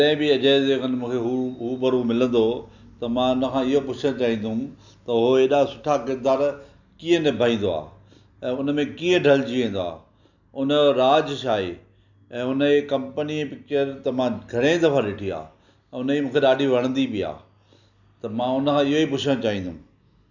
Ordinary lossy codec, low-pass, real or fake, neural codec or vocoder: none; 7.2 kHz; real; none